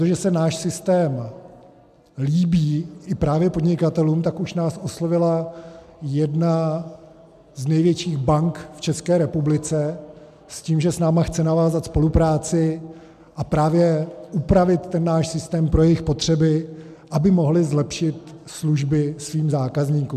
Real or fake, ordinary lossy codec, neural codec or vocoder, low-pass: real; MP3, 96 kbps; none; 14.4 kHz